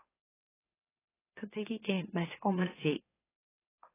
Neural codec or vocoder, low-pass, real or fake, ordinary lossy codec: autoencoder, 44.1 kHz, a latent of 192 numbers a frame, MeloTTS; 3.6 kHz; fake; AAC, 16 kbps